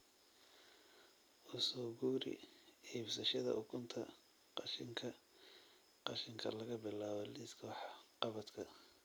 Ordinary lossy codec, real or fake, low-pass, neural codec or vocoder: none; real; none; none